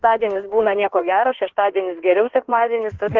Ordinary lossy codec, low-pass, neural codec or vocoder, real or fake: Opus, 16 kbps; 7.2 kHz; codec, 16 kHz in and 24 kHz out, 1.1 kbps, FireRedTTS-2 codec; fake